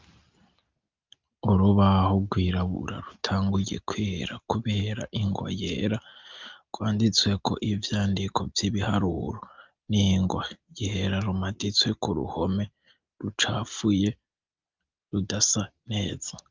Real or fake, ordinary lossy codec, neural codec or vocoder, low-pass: real; Opus, 24 kbps; none; 7.2 kHz